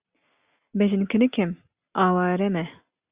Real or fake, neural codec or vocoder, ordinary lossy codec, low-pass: real; none; Opus, 64 kbps; 3.6 kHz